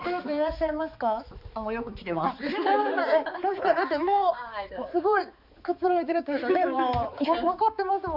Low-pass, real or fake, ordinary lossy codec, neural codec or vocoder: 5.4 kHz; fake; none; codec, 16 kHz, 4 kbps, X-Codec, HuBERT features, trained on balanced general audio